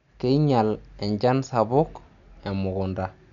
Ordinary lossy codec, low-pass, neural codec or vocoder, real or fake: none; 7.2 kHz; none; real